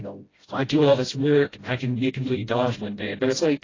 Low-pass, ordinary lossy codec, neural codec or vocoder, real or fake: 7.2 kHz; AAC, 32 kbps; codec, 16 kHz, 0.5 kbps, FreqCodec, smaller model; fake